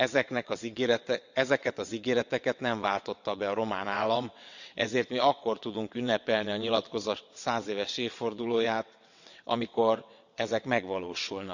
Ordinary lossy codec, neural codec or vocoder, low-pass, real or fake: none; vocoder, 22.05 kHz, 80 mel bands, WaveNeXt; 7.2 kHz; fake